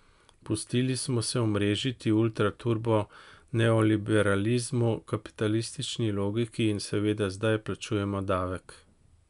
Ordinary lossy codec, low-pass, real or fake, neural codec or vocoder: none; 10.8 kHz; real; none